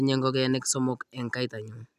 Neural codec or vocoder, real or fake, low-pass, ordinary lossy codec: none; real; 14.4 kHz; none